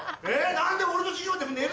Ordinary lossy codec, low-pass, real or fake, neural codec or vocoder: none; none; real; none